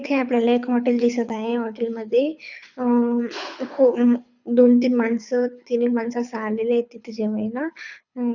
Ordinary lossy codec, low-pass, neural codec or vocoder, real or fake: none; 7.2 kHz; codec, 24 kHz, 6 kbps, HILCodec; fake